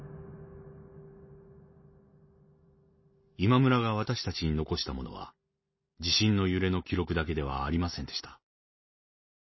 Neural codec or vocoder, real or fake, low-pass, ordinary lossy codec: none; real; 7.2 kHz; MP3, 24 kbps